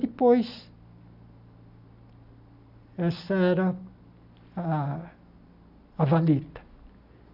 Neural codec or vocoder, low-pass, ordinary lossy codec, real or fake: none; 5.4 kHz; none; real